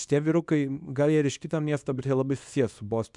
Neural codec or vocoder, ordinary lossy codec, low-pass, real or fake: codec, 24 kHz, 0.9 kbps, WavTokenizer, small release; MP3, 96 kbps; 10.8 kHz; fake